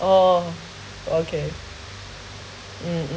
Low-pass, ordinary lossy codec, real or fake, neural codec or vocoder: none; none; real; none